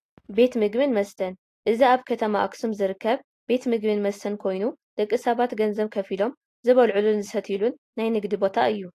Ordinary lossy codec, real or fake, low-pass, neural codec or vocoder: AAC, 48 kbps; real; 14.4 kHz; none